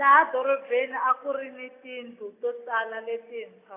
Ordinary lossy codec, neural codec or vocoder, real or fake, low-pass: AAC, 24 kbps; none; real; 3.6 kHz